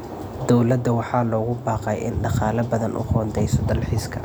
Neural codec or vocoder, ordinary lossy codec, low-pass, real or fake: none; none; none; real